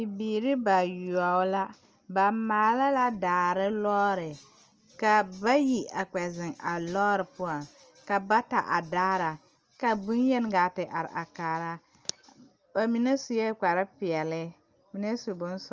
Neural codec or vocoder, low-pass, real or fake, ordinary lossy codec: none; 7.2 kHz; real; Opus, 24 kbps